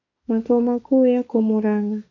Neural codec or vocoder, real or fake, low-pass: autoencoder, 48 kHz, 32 numbers a frame, DAC-VAE, trained on Japanese speech; fake; 7.2 kHz